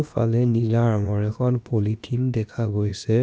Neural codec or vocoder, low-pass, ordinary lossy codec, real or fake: codec, 16 kHz, about 1 kbps, DyCAST, with the encoder's durations; none; none; fake